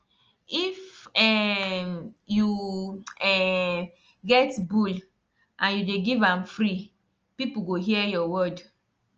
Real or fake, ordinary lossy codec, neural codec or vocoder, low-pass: real; Opus, 24 kbps; none; 7.2 kHz